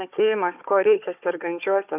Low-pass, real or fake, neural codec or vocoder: 3.6 kHz; fake; codec, 16 kHz, 4 kbps, FunCodec, trained on Chinese and English, 50 frames a second